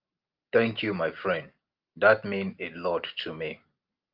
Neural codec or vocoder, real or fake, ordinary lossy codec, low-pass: none; real; Opus, 32 kbps; 5.4 kHz